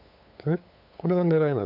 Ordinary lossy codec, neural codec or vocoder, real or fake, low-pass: none; codec, 16 kHz, 8 kbps, FunCodec, trained on LibriTTS, 25 frames a second; fake; 5.4 kHz